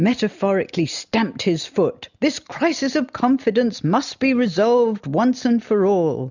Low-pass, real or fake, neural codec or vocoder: 7.2 kHz; real; none